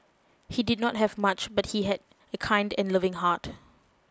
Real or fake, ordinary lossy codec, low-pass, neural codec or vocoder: real; none; none; none